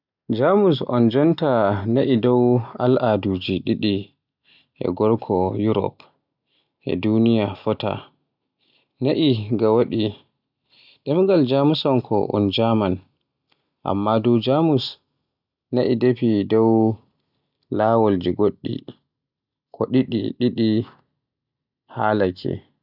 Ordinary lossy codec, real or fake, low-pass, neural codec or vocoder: none; real; 5.4 kHz; none